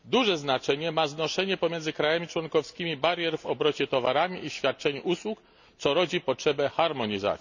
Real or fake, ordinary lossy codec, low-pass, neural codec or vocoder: real; none; 7.2 kHz; none